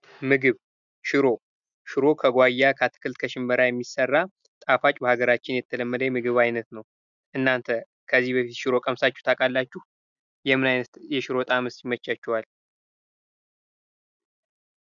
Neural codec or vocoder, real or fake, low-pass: none; real; 7.2 kHz